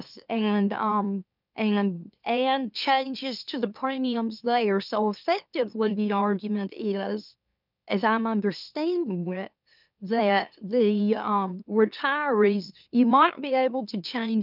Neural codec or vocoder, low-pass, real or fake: autoencoder, 44.1 kHz, a latent of 192 numbers a frame, MeloTTS; 5.4 kHz; fake